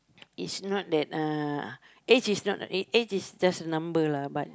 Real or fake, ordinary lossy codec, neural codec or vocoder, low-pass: real; none; none; none